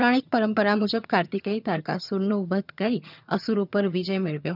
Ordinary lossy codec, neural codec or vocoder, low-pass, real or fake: none; vocoder, 22.05 kHz, 80 mel bands, HiFi-GAN; 5.4 kHz; fake